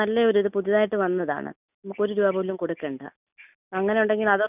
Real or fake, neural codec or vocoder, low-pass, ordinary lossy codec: real; none; 3.6 kHz; none